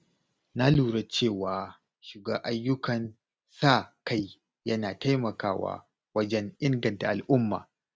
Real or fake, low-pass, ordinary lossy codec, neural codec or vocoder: real; none; none; none